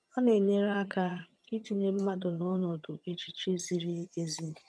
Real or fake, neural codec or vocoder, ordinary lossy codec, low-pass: fake; vocoder, 22.05 kHz, 80 mel bands, HiFi-GAN; none; none